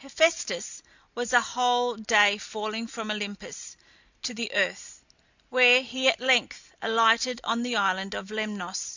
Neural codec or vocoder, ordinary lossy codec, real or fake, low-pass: none; Opus, 64 kbps; real; 7.2 kHz